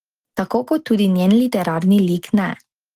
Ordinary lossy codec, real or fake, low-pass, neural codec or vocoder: Opus, 16 kbps; real; 14.4 kHz; none